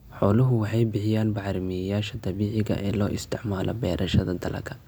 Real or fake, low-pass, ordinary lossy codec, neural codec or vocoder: real; none; none; none